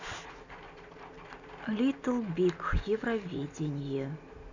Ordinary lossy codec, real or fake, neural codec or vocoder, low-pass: none; real; none; 7.2 kHz